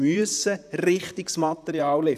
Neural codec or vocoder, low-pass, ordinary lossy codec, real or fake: vocoder, 44.1 kHz, 128 mel bands, Pupu-Vocoder; 14.4 kHz; none; fake